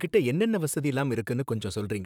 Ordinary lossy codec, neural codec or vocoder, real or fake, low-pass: none; vocoder, 44.1 kHz, 128 mel bands, Pupu-Vocoder; fake; 19.8 kHz